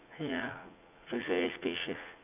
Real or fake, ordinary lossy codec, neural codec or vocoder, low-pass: fake; AAC, 32 kbps; vocoder, 44.1 kHz, 80 mel bands, Vocos; 3.6 kHz